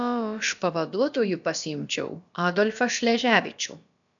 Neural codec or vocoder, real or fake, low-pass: codec, 16 kHz, about 1 kbps, DyCAST, with the encoder's durations; fake; 7.2 kHz